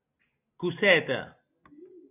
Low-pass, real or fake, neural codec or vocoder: 3.6 kHz; real; none